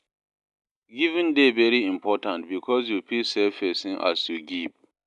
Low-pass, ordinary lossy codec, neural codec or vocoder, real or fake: 10.8 kHz; none; none; real